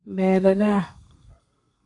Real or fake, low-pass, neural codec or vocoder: fake; 10.8 kHz; codec, 32 kHz, 1.9 kbps, SNAC